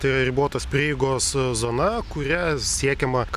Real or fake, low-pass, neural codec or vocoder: real; 14.4 kHz; none